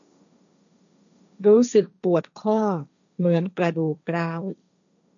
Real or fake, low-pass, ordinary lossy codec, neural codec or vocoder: fake; 7.2 kHz; none; codec, 16 kHz, 1.1 kbps, Voila-Tokenizer